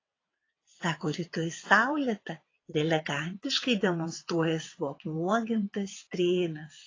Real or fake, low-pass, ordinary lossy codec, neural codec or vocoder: fake; 7.2 kHz; AAC, 32 kbps; vocoder, 22.05 kHz, 80 mel bands, WaveNeXt